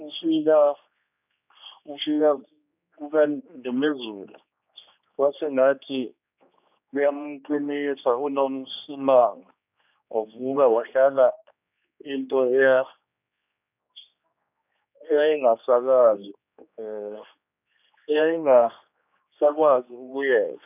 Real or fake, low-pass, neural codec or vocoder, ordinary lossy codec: fake; 3.6 kHz; codec, 16 kHz, 2 kbps, X-Codec, HuBERT features, trained on general audio; none